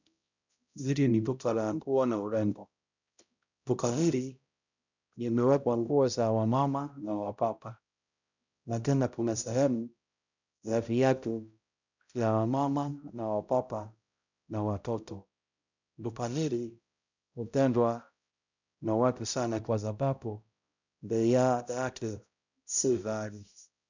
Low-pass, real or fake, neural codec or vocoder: 7.2 kHz; fake; codec, 16 kHz, 0.5 kbps, X-Codec, HuBERT features, trained on balanced general audio